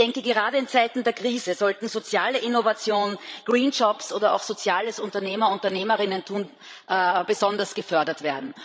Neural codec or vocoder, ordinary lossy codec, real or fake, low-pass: codec, 16 kHz, 16 kbps, FreqCodec, larger model; none; fake; none